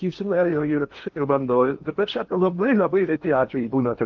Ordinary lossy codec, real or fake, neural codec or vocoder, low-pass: Opus, 32 kbps; fake; codec, 16 kHz in and 24 kHz out, 0.8 kbps, FocalCodec, streaming, 65536 codes; 7.2 kHz